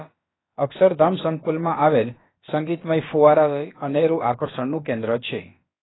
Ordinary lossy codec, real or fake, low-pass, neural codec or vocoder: AAC, 16 kbps; fake; 7.2 kHz; codec, 16 kHz, about 1 kbps, DyCAST, with the encoder's durations